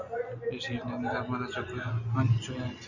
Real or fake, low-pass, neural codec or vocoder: real; 7.2 kHz; none